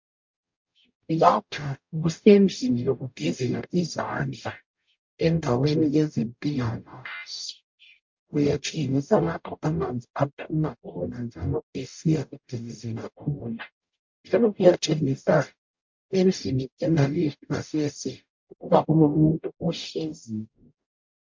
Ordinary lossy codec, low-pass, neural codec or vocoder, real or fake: MP3, 48 kbps; 7.2 kHz; codec, 44.1 kHz, 0.9 kbps, DAC; fake